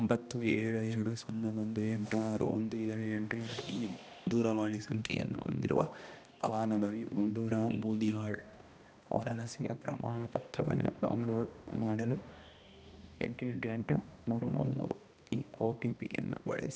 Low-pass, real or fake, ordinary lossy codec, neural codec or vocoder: none; fake; none; codec, 16 kHz, 1 kbps, X-Codec, HuBERT features, trained on balanced general audio